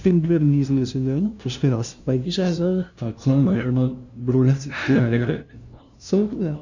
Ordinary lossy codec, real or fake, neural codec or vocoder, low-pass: none; fake; codec, 16 kHz, 0.5 kbps, FunCodec, trained on LibriTTS, 25 frames a second; 7.2 kHz